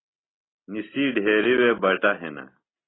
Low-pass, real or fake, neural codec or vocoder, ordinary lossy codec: 7.2 kHz; real; none; AAC, 16 kbps